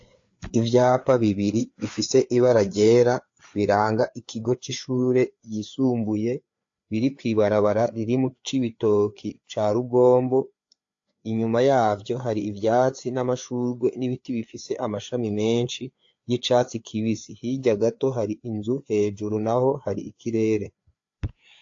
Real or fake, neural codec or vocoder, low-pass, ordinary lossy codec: fake; codec, 16 kHz, 4 kbps, FreqCodec, larger model; 7.2 kHz; AAC, 48 kbps